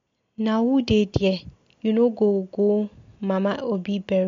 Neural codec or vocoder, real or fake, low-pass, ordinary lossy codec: none; real; 7.2 kHz; MP3, 48 kbps